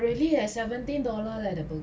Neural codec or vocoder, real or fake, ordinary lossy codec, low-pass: none; real; none; none